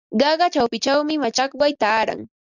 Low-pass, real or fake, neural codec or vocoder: 7.2 kHz; real; none